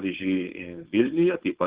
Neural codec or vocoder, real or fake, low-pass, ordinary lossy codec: codec, 16 kHz, 4.8 kbps, FACodec; fake; 3.6 kHz; Opus, 16 kbps